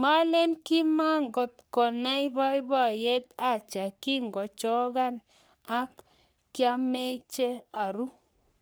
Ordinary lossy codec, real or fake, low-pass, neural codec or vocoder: none; fake; none; codec, 44.1 kHz, 3.4 kbps, Pupu-Codec